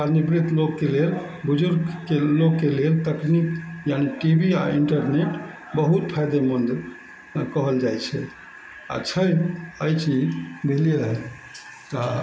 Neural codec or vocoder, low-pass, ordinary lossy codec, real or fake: none; none; none; real